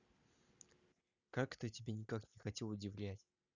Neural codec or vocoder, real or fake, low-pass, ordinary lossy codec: none; real; 7.2 kHz; none